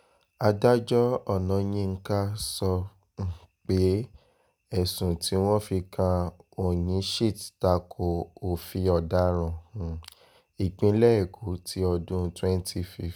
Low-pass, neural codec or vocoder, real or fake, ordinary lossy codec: none; none; real; none